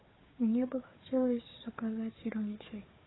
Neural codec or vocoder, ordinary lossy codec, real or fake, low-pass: codec, 16 kHz, 4 kbps, FunCodec, trained on Chinese and English, 50 frames a second; AAC, 16 kbps; fake; 7.2 kHz